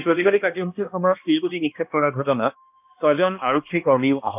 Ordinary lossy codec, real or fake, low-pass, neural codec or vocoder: none; fake; 3.6 kHz; codec, 16 kHz, 1 kbps, X-Codec, HuBERT features, trained on balanced general audio